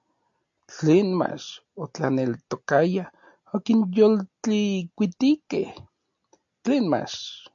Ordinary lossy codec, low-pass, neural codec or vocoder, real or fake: AAC, 64 kbps; 7.2 kHz; none; real